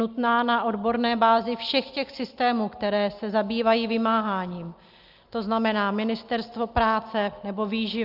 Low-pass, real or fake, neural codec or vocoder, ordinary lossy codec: 5.4 kHz; real; none; Opus, 24 kbps